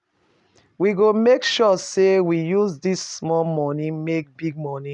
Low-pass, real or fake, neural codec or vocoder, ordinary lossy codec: 10.8 kHz; real; none; none